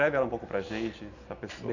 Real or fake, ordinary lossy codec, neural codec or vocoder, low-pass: real; none; none; 7.2 kHz